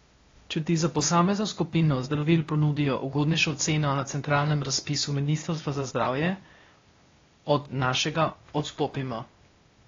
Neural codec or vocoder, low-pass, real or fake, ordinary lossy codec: codec, 16 kHz, 0.8 kbps, ZipCodec; 7.2 kHz; fake; AAC, 32 kbps